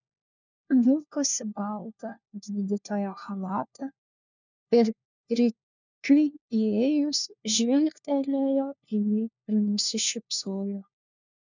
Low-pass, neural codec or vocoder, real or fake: 7.2 kHz; codec, 16 kHz, 1 kbps, FunCodec, trained on LibriTTS, 50 frames a second; fake